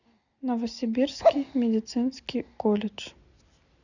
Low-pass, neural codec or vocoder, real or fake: 7.2 kHz; none; real